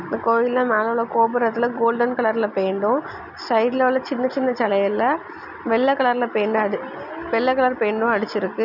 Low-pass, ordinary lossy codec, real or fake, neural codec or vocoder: 5.4 kHz; none; real; none